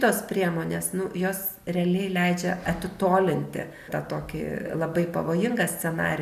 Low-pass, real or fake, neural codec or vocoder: 14.4 kHz; real; none